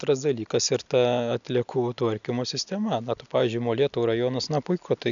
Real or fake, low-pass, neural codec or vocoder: real; 7.2 kHz; none